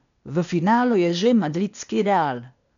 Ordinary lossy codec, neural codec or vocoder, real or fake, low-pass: none; codec, 16 kHz, 0.8 kbps, ZipCodec; fake; 7.2 kHz